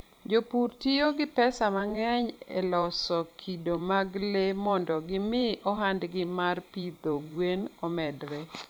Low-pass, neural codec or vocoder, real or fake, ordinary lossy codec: none; vocoder, 44.1 kHz, 128 mel bands every 512 samples, BigVGAN v2; fake; none